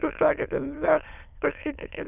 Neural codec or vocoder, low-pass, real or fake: autoencoder, 22.05 kHz, a latent of 192 numbers a frame, VITS, trained on many speakers; 3.6 kHz; fake